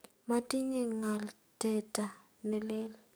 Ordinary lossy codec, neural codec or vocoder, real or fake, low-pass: none; codec, 44.1 kHz, 7.8 kbps, DAC; fake; none